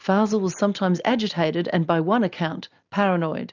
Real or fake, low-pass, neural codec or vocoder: real; 7.2 kHz; none